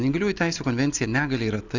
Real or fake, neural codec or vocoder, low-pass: real; none; 7.2 kHz